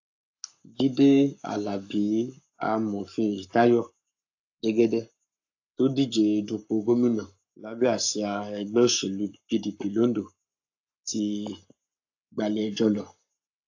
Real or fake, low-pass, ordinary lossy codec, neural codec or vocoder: fake; 7.2 kHz; AAC, 48 kbps; codec, 44.1 kHz, 7.8 kbps, Pupu-Codec